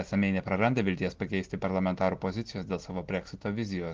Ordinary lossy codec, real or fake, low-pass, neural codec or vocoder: Opus, 16 kbps; real; 7.2 kHz; none